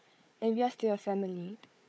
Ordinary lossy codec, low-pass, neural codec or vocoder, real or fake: none; none; codec, 16 kHz, 4 kbps, FunCodec, trained on Chinese and English, 50 frames a second; fake